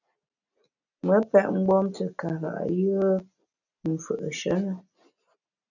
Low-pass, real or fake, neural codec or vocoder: 7.2 kHz; real; none